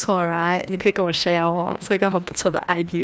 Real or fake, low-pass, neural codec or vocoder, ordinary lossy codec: fake; none; codec, 16 kHz, 1 kbps, FreqCodec, larger model; none